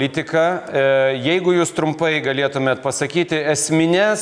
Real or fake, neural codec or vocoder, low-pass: real; none; 9.9 kHz